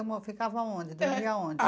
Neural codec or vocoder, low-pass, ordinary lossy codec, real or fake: none; none; none; real